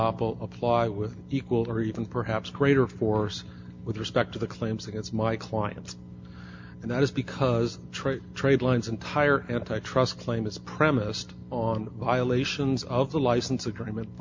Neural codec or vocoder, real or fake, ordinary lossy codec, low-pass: none; real; MP3, 48 kbps; 7.2 kHz